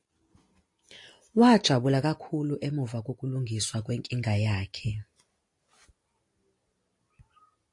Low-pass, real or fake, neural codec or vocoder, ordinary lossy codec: 10.8 kHz; real; none; AAC, 48 kbps